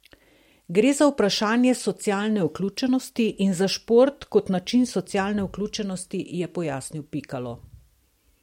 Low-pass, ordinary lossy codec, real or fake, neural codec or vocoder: 19.8 kHz; MP3, 64 kbps; real; none